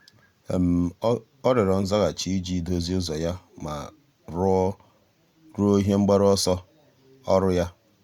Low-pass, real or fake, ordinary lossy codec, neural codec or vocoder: 19.8 kHz; real; Opus, 64 kbps; none